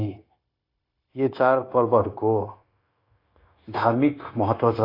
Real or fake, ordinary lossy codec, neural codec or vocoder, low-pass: fake; AAC, 32 kbps; codec, 16 kHz, 0.9 kbps, LongCat-Audio-Codec; 5.4 kHz